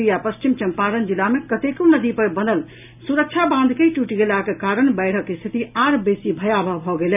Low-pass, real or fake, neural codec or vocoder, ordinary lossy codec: 3.6 kHz; real; none; none